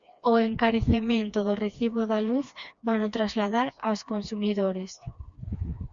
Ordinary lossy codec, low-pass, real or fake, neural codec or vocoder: Opus, 64 kbps; 7.2 kHz; fake; codec, 16 kHz, 2 kbps, FreqCodec, smaller model